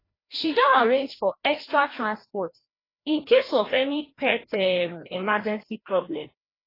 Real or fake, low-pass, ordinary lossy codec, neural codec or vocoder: fake; 5.4 kHz; AAC, 24 kbps; codec, 16 kHz, 1 kbps, FreqCodec, larger model